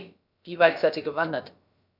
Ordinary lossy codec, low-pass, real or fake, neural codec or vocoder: none; 5.4 kHz; fake; codec, 16 kHz, about 1 kbps, DyCAST, with the encoder's durations